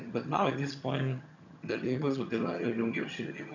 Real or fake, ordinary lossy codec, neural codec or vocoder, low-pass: fake; none; vocoder, 22.05 kHz, 80 mel bands, HiFi-GAN; 7.2 kHz